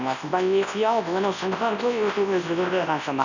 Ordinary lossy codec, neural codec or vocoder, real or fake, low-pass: none; codec, 24 kHz, 0.9 kbps, WavTokenizer, large speech release; fake; 7.2 kHz